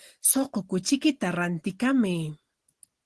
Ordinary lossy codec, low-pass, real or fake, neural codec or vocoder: Opus, 16 kbps; 10.8 kHz; real; none